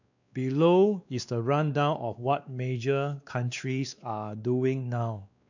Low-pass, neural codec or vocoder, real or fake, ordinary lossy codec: 7.2 kHz; codec, 16 kHz, 2 kbps, X-Codec, WavLM features, trained on Multilingual LibriSpeech; fake; none